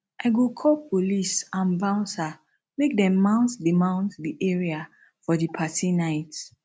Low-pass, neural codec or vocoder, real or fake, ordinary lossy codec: none; none; real; none